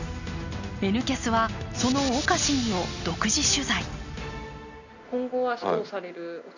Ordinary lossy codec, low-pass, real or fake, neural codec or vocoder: none; 7.2 kHz; real; none